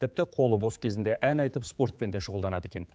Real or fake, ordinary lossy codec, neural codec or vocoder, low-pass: fake; none; codec, 16 kHz, 4 kbps, X-Codec, HuBERT features, trained on general audio; none